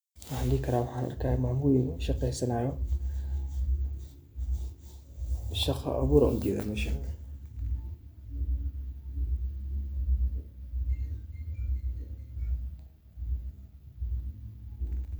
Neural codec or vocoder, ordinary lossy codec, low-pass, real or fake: none; none; none; real